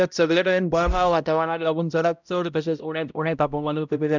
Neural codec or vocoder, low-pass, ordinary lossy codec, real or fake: codec, 16 kHz, 0.5 kbps, X-Codec, HuBERT features, trained on balanced general audio; 7.2 kHz; none; fake